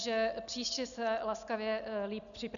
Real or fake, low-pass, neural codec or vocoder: real; 7.2 kHz; none